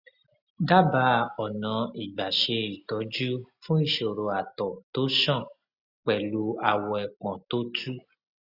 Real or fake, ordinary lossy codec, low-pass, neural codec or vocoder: real; Opus, 64 kbps; 5.4 kHz; none